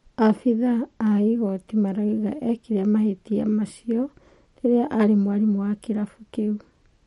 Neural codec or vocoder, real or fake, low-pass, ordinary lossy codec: none; real; 14.4 kHz; MP3, 48 kbps